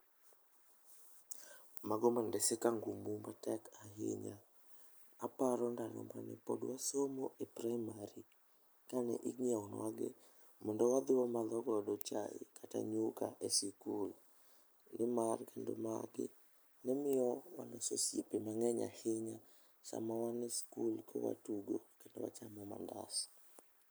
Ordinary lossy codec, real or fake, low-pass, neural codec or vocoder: none; real; none; none